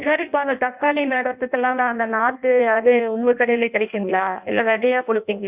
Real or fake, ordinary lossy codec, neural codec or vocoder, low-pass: fake; Opus, 64 kbps; codec, 16 kHz in and 24 kHz out, 0.6 kbps, FireRedTTS-2 codec; 3.6 kHz